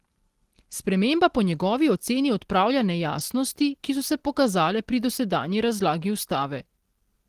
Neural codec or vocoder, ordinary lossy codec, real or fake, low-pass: none; Opus, 16 kbps; real; 14.4 kHz